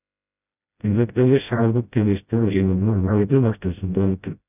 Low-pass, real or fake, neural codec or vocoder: 3.6 kHz; fake; codec, 16 kHz, 0.5 kbps, FreqCodec, smaller model